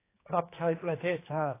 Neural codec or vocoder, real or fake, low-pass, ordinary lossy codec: codec, 16 kHz, 2 kbps, X-Codec, HuBERT features, trained on general audio; fake; 3.6 kHz; AAC, 24 kbps